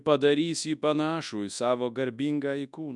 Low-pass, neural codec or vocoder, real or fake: 10.8 kHz; codec, 24 kHz, 0.9 kbps, WavTokenizer, large speech release; fake